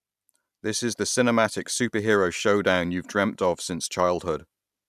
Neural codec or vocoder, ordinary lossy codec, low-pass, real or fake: none; none; 14.4 kHz; real